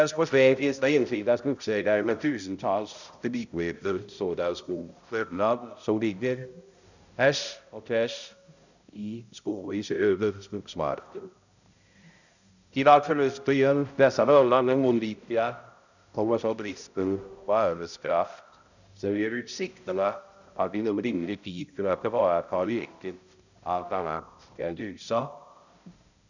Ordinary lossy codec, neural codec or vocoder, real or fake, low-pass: none; codec, 16 kHz, 0.5 kbps, X-Codec, HuBERT features, trained on balanced general audio; fake; 7.2 kHz